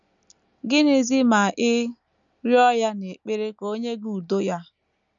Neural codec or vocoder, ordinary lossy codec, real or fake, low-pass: none; none; real; 7.2 kHz